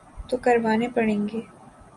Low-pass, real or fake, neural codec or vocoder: 10.8 kHz; real; none